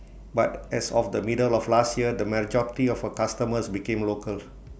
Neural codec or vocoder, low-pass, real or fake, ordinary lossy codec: none; none; real; none